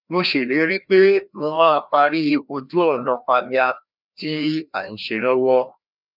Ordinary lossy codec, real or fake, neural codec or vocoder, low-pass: none; fake; codec, 16 kHz, 1 kbps, FreqCodec, larger model; 5.4 kHz